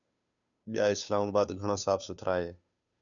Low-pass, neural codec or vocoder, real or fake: 7.2 kHz; codec, 16 kHz, 2 kbps, FunCodec, trained on Chinese and English, 25 frames a second; fake